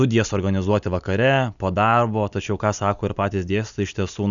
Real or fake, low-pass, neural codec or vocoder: real; 7.2 kHz; none